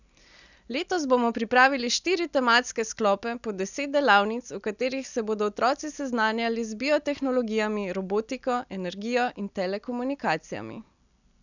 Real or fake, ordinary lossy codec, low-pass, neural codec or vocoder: real; none; 7.2 kHz; none